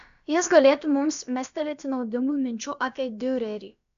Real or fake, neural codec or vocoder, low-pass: fake; codec, 16 kHz, about 1 kbps, DyCAST, with the encoder's durations; 7.2 kHz